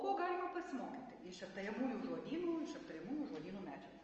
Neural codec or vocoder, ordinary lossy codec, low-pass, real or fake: none; Opus, 24 kbps; 7.2 kHz; real